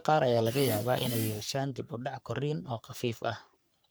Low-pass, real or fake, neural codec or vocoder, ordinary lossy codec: none; fake; codec, 44.1 kHz, 3.4 kbps, Pupu-Codec; none